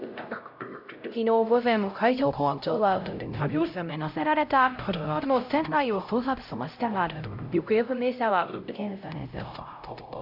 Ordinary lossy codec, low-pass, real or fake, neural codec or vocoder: none; 5.4 kHz; fake; codec, 16 kHz, 0.5 kbps, X-Codec, HuBERT features, trained on LibriSpeech